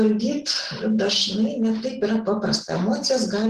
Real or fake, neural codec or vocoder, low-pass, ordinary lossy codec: real; none; 14.4 kHz; Opus, 16 kbps